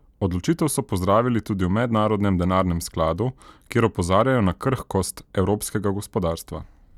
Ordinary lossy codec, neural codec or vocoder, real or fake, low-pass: none; none; real; 19.8 kHz